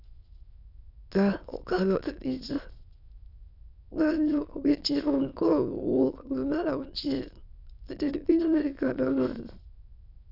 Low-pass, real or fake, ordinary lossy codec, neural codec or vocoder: 5.4 kHz; fake; none; autoencoder, 22.05 kHz, a latent of 192 numbers a frame, VITS, trained on many speakers